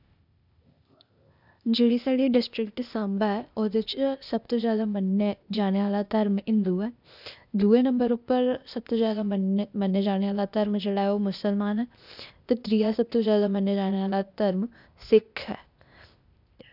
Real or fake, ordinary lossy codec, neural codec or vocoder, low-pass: fake; none; codec, 16 kHz, 0.8 kbps, ZipCodec; 5.4 kHz